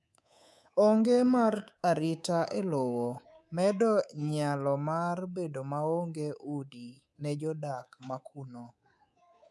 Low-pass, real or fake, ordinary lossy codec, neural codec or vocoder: none; fake; none; codec, 24 kHz, 3.1 kbps, DualCodec